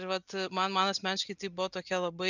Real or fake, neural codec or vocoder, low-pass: real; none; 7.2 kHz